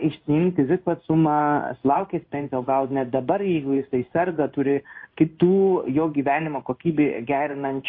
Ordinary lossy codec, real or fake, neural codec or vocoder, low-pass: MP3, 32 kbps; fake; codec, 16 kHz in and 24 kHz out, 1 kbps, XY-Tokenizer; 5.4 kHz